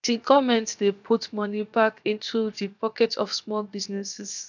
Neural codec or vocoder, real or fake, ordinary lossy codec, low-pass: codec, 16 kHz, 0.7 kbps, FocalCodec; fake; none; 7.2 kHz